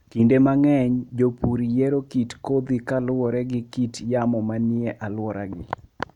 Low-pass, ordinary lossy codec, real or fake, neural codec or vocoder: 19.8 kHz; none; real; none